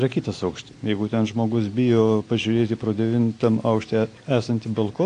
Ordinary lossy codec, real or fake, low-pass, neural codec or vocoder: AAC, 48 kbps; real; 9.9 kHz; none